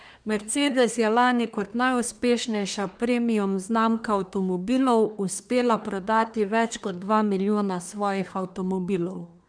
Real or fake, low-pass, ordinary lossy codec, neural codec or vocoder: fake; 9.9 kHz; none; codec, 24 kHz, 1 kbps, SNAC